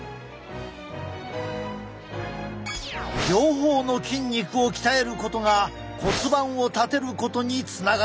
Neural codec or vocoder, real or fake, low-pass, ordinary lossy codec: none; real; none; none